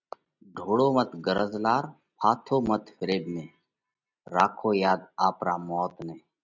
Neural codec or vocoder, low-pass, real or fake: none; 7.2 kHz; real